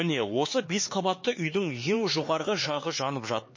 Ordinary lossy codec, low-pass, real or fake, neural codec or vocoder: MP3, 32 kbps; 7.2 kHz; fake; codec, 16 kHz, 4 kbps, X-Codec, HuBERT features, trained on LibriSpeech